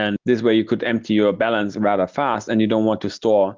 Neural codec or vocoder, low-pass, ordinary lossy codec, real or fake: none; 7.2 kHz; Opus, 16 kbps; real